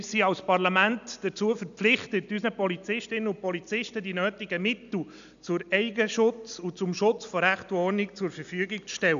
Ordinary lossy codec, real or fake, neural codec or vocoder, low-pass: none; real; none; 7.2 kHz